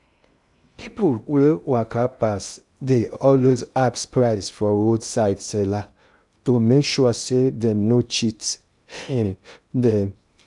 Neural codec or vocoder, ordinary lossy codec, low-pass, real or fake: codec, 16 kHz in and 24 kHz out, 0.6 kbps, FocalCodec, streaming, 2048 codes; none; 10.8 kHz; fake